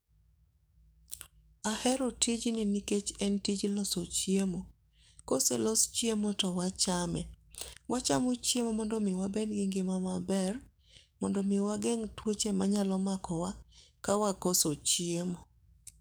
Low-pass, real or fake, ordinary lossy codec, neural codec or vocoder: none; fake; none; codec, 44.1 kHz, 7.8 kbps, DAC